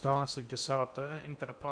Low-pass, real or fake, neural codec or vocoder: 9.9 kHz; fake; codec, 16 kHz in and 24 kHz out, 0.8 kbps, FocalCodec, streaming, 65536 codes